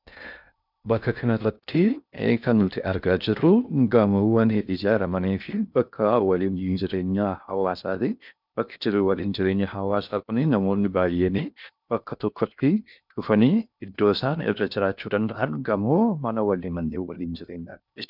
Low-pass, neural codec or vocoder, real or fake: 5.4 kHz; codec, 16 kHz in and 24 kHz out, 0.6 kbps, FocalCodec, streaming, 2048 codes; fake